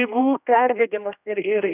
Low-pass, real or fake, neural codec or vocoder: 3.6 kHz; fake; codec, 16 kHz, 1 kbps, X-Codec, HuBERT features, trained on general audio